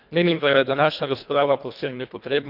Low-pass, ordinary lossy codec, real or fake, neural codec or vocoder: 5.4 kHz; none; fake; codec, 24 kHz, 1.5 kbps, HILCodec